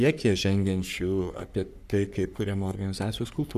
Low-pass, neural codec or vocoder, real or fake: 14.4 kHz; codec, 44.1 kHz, 3.4 kbps, Pupu-Codec; fake